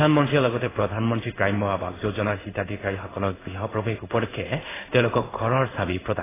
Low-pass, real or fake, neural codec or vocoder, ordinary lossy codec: 3.6 kHz; fake; codec, 16 kHz in and 24 kHz out, 1 kbps, XY-Tokenizer; AAC, 16 kbps